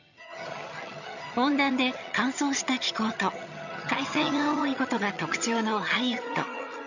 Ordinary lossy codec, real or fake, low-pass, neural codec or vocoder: none; fake; 7.2 kHz; vocoder, 22.05 kHz, 80 mel bands, HiFi-GAN